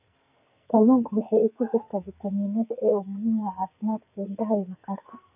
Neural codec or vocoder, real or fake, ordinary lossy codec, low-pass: codec, 44.1 kHz, 2.6 kbps, SNAC; fake; none; 3.6 kHz